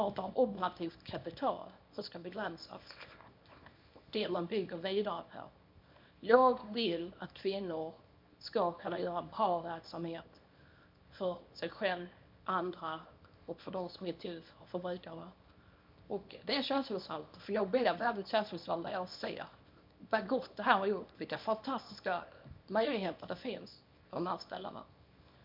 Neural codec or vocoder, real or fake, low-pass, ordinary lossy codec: codec, 24 kHz, 0.9 kbps, WavTokenizer, small release; fake; 5.4 kHz; MP3, 48 kbps